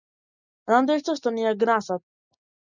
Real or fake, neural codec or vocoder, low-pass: real; none; 7.2 kHz